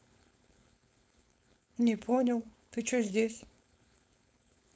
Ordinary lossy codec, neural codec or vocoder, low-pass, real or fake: none; codec, 16 kHz, 4.8 kbps, FACodec; none; fake